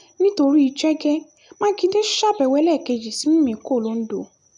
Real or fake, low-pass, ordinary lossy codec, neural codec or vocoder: real; 10.8 kHz; none; none